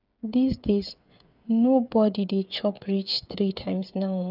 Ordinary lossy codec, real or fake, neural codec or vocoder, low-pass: none; fake; codec, 16 kHz, 8 kbps, FreqCodec, smaller model; 5.4 kHz